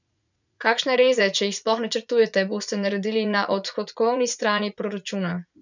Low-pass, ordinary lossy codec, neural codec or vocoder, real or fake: 7.2 kHz; none; vocoder, 44.1 kHz, 80 mel bands, Vocos; fake